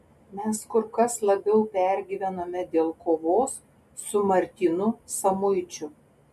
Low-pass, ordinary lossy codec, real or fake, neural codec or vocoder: 14.4 kHz; MP3, 64 kbps; real; none